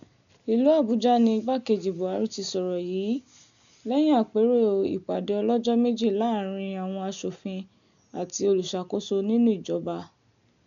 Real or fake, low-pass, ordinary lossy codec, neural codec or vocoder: real; 7.2 kHz; none; none